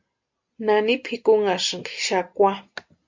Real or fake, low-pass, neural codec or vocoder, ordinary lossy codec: real; 7.2 kHz; none; MP3, 64 kbps